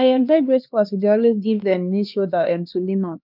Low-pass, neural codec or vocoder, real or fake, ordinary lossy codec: 5.4 kHz; codec, 16 kHz, 2 kbps, X-Codec, HuBERT features, trained on LibriSpeech; fake; none